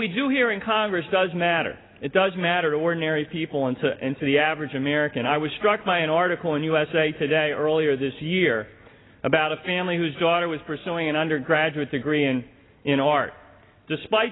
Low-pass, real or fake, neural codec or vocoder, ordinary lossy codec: 7.2 kHz; real; none; AAC, 16 kbps